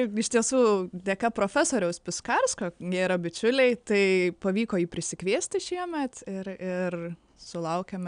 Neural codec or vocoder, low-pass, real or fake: none; 9.9 kHz; real